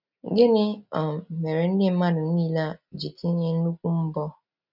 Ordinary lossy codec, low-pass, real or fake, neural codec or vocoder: none; 5.4 kHz; real; none